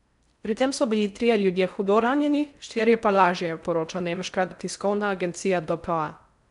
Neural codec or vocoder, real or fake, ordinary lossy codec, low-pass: codec, 16 kHz in and 24 kHz out, 0.6 kbps, FocalCodec, streaming, 4096 codes; fake; none; 10.8 kHz